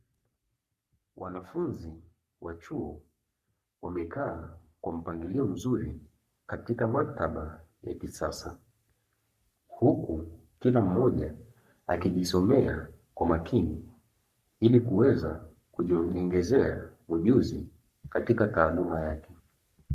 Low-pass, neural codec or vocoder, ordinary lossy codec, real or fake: 14.4 kHz; codec, 44.1 kHz, 3.4 kbps, Pupu-Codec; Opus, 64 kbps; fake